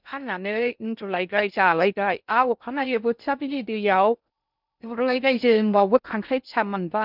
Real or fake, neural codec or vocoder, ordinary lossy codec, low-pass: fake; codec, 16 kHz in and 24 kHz out, 0.6 kbps, FocalCodec, streaming, 2048 codes; none; 5.4 kHz